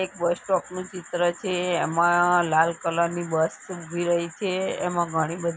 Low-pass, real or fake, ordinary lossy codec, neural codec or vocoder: none; real; none; none